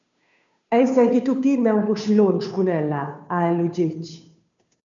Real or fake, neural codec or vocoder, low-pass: fake; codec, 16 kHz, 2 kbps, FunCodec, trained on Chinese and English, 25 frames a second; 7.2 kHz